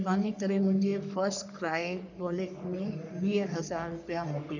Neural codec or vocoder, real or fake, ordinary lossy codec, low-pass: codec, 44.1 kHz, 3.4 kbps, Pupu-Codec; fake; none; 7.2 kHz